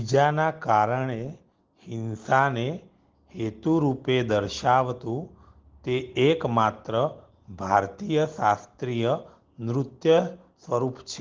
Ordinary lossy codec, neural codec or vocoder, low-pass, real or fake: Opus, 16 kbps; none; 7.2 kHz; real